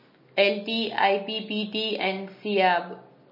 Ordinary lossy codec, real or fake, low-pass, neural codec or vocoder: MP3, 24 kbps; real; 5.4 kHz; none